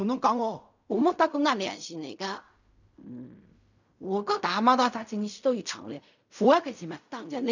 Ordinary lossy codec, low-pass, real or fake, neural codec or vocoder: none; 7.2 kHz; fake; codec, 16 kHz in and 24 kHz out, 0.4 kbps, LongCat-Audio-Codec, fine tuned four codebook decoder